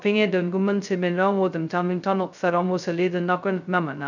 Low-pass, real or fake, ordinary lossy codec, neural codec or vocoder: 7.2 kHz; fake; none; codec, 16 kHz, 0.2 kbps, FocalCodec